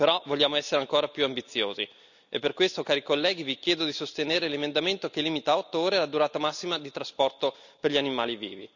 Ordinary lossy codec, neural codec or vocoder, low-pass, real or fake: none; none; 7.2 kHz; real